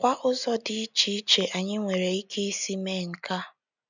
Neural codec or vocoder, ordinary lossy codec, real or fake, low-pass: none; none; real; 7.2 kHz